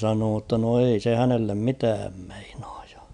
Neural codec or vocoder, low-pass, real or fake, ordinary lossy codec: none; 9.9 kHz; real; none